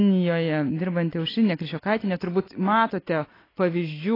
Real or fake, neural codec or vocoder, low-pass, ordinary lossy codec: real; none; 5.4 kHz; AAC, 24 kbps